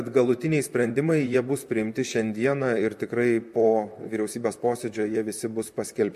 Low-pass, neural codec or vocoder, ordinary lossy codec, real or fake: 14.4 kHz; vocoder, 44.1 kHz, 128 mel bands, Pupu-Vocoder; MP3, 64 kbps; fake